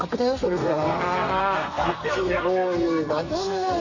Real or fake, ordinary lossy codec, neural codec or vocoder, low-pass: fake; none; codec, 32 kHz, 1.9 kbps, SNAC; 7.2 kHz